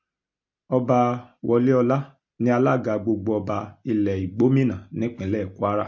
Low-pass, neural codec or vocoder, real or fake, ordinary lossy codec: 7.2 kHz; none; real; MP3, 48 kbps